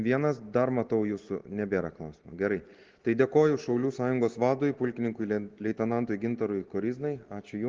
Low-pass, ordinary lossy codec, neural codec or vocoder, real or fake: 7.2 kHz; Opus, 16 kbps; none; real